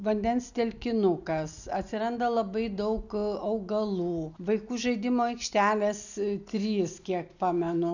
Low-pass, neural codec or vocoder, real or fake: 7.2 kHz; none; real